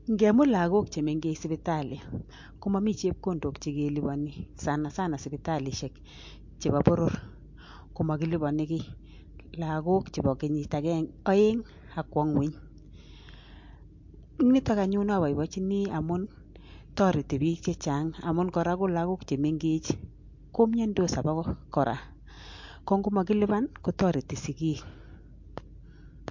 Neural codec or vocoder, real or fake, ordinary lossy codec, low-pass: none; real; MP3, 48 kbps; 7.2 kHz